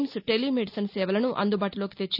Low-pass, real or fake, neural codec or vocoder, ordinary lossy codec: 5.4 kHz; real; none; none